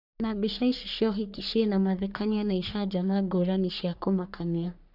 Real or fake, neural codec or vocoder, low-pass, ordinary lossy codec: fake; codec, 44.1 kHz, 3.4 kbps, Pupu-Codec; 5.4 kHz; none